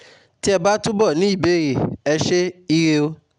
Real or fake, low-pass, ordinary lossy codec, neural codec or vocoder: real; 9.9 kHz; none; none